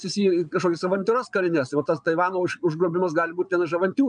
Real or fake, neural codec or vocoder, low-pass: fake; vocoder, 22.05 kHz, 80 mel bands, Vocos; 9.9 kHz